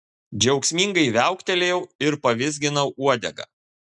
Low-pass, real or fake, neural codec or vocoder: 10.8 kHz; real; none